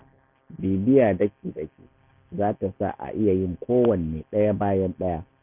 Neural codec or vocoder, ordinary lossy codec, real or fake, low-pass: none; none; real; 3.6 kHz